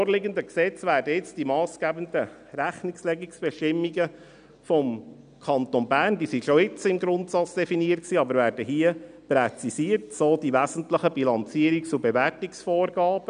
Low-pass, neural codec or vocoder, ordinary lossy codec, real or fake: 9.9 kHz; none; none; real